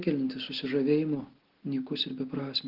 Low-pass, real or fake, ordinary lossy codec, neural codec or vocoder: 5.4 kHz; real; Opus, 16 kbps; none